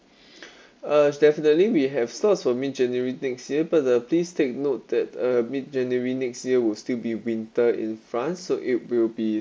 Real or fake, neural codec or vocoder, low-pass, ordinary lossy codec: real; none; none; none